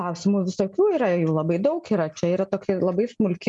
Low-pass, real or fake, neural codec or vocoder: 10.8 kHz; real; none